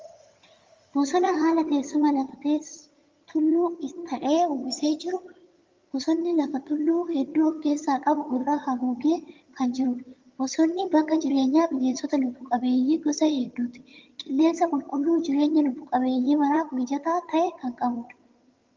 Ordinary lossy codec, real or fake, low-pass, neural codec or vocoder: Opus, 32 kbps; fake; 7.2 kHz; vocoder, 22.05 kHz, 80 mel bands, HiFi-GAN